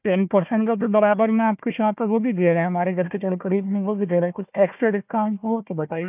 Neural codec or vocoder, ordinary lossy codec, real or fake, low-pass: codec, 16 kHz, 1 kbps, FunCodec, trained on Chinese and English, 50 frames a second; none; fake; 3.6 kHz